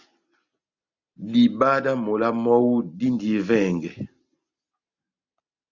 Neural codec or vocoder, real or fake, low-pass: none; real; 7.2 kHz